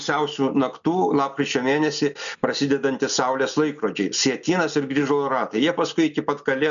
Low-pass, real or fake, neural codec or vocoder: 7.2 kHz; real; none